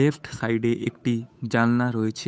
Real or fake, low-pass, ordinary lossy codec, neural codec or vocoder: fake; none; none; codec, 16 kHz, 8 kbps, FunCodec, trained on Chinese and English, 25 frames a second